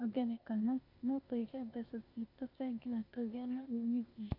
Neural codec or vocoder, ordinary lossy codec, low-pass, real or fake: codec, 16 kHz, 0.8 kbps, ZipCodec; none; 5.4 kHz; fake